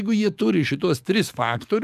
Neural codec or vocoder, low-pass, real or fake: codec, 44.1 kHz, 7.8 kbps, DAC; 14.4 kHz; fake